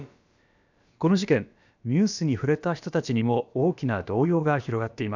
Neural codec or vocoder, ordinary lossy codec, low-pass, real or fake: codec, 16 kHz, about 1 kbps, DyCAST, with the encoder's durations; none; 7.2 kHz; fake